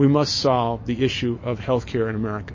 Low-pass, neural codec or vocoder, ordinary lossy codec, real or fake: 7.2 kHz; autoencoder, 48 kHz, 128 numbers a frame, DAC-VAE, trained on Japanese speech; MP3, 32 kbps; fake